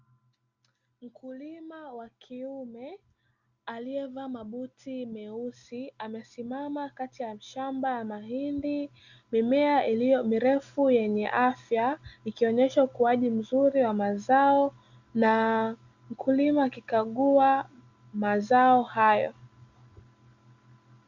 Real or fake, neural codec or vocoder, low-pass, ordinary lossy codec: real; none; 7.2 kHz; Opus, 64 kbps